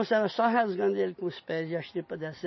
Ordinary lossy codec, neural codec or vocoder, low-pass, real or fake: MP3, 24 kbps; none; 7.2 kHz; real